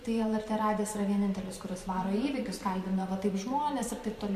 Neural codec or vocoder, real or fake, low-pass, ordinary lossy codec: vocoder, 44.1 kHz, 128 mel bands every 512 samples, BigVGAN v2; fake; 14.4 kHz; MP3, 64 kbps